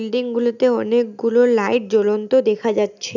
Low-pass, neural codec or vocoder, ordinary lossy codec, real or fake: 7.2 kHz; none; none; real